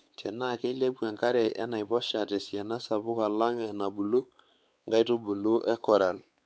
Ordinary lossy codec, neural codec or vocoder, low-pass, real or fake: none; codec, 16 kHz, 4 kbps, X-Codec, WavLM features, trained on Multilingual LibriSpeech; none; fake